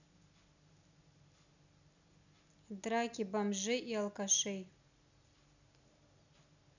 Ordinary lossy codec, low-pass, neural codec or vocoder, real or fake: none; 7.2 kHz; none; real